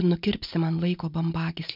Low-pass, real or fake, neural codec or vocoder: 5.4 kHz; real; none